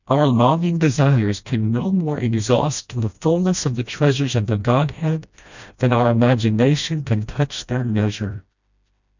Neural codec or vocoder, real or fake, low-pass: codec, 16 kHz, 1 kbps, FreqCodec, smaller model; fake; 7.2 kHz